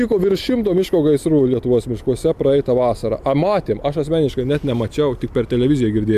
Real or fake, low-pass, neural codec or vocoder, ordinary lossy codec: real; 14.4 kHz; none; AAC, 96 kbps